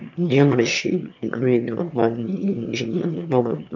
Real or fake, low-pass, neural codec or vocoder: fake; 7.2 kHz; autoencoder, 22.05 kHz, a latent of 192 numbers a frame, VITS, trained on one speaker